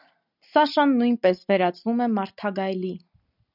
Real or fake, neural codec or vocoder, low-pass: real; none; 5.4 kHz